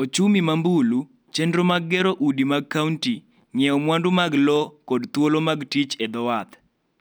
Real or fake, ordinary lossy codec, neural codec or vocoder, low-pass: real; none; none; none